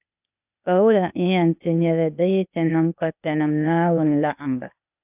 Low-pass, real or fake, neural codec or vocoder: 3.6 kHz; fake; codec, 16 kHz, 0.8 kbps, ZipCodec